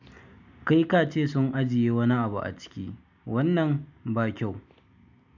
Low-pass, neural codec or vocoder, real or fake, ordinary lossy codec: 7.2 kHz; none; real; none